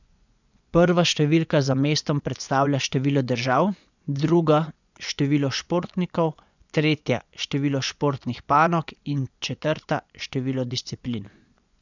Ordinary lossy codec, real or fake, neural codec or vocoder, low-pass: none; fake; vocoder, 24 kHz, 100 mel bands, Vocos; 7.2 kHz